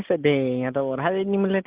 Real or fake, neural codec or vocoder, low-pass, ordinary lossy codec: real; none; 3.6 kHz; Opus, 32 kbps